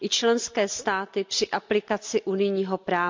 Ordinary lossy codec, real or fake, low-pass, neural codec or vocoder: MP3, 64 kbps; real; 7.2 kHz; none